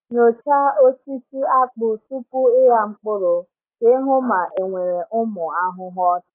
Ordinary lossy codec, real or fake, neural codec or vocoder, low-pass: AAC, 24 kbps; real; none; 3.6 kHz